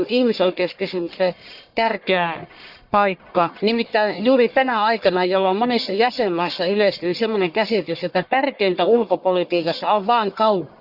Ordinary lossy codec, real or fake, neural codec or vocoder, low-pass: Opus, 64 kbps; fake; codec, 44.1 kHz, 1.7 kbps, Pupu-Codec; 5.4 kHz